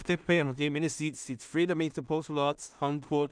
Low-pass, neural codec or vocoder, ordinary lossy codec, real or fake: 9.9 kHz; codec, 16 kHz in and 24 kHz out, 0.4 kbps, LongCat-Audio-Codec, two codebook decoder; none; fake